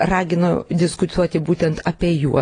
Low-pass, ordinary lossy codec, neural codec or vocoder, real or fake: 9.9 kHz; AAC, 32 kbps; none; real